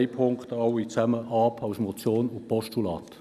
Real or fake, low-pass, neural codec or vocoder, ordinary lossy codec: real; 14.4 kHz; none; none